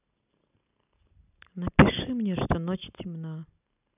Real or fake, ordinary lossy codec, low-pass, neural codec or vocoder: real; none; 3.6 kHz; none